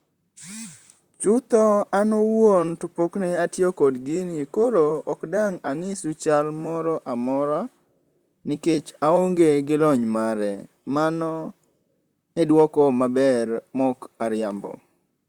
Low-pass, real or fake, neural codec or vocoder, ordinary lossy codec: 19.8 kHz; fake; vocoder, 44.1 kHz, 128 mel bands, Pupu-Vocoder; Opus, 64 kbps